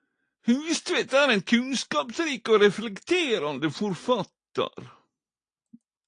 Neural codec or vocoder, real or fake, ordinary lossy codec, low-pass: none; real; AAC, 48 kbps; 9.9 kHz